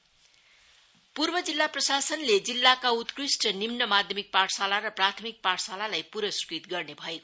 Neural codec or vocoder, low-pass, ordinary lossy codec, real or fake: none; none; none; real